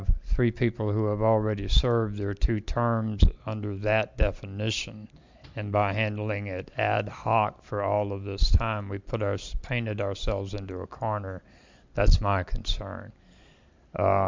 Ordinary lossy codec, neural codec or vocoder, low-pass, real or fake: MP3, 64 kbps; none; 7.2 kHz; real